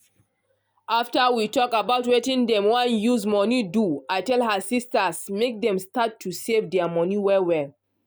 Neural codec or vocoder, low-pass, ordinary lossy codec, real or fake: none; none; none; real